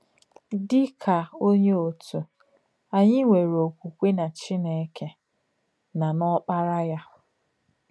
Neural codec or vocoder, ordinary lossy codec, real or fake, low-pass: none; none; real; none